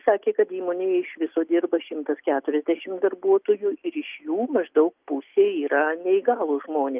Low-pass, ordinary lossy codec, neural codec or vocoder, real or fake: 3.6 kHz; Opus, 24 kbps; none; real